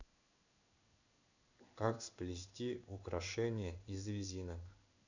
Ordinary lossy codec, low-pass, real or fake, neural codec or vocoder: none; 7.2 kHz; fake; codec, 16 kHz in and 24 kHz out, 1 kbps, XY-Tokenizer